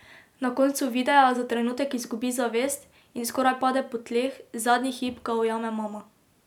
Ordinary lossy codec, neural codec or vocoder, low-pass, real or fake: none; none; 19.8 kHz; real